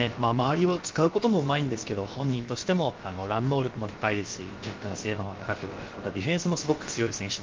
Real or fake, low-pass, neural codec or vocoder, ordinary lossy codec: fake; 7.2 kHz; codec, 16 kHz, about 1 kbps, DyCAST, with the encoder's durations; Opus, 32 kbps